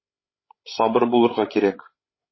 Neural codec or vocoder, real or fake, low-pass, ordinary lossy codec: codec, 16 kHz, 16 kbps, FreqCodec, larger model; fake; 7.2 kHz; MP3, 24 kbps